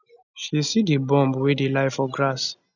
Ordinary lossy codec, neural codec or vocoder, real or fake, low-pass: none; none; real; 7.2 kHz